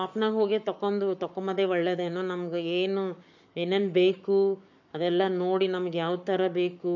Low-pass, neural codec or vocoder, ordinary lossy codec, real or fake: 7.2 kHz; codec, 44.1 kHz, 7.8 kbps, Pupu-Codec; none; fake